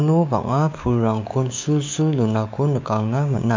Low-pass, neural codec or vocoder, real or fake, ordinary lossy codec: 7.2 kHz; codec, 16 kHz, 6 kbps, DAC; fake; MP3, 48 kbps